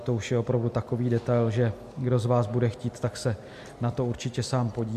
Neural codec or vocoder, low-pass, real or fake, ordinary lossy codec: none; 14.4 kHz; real; MP3, 64 kbps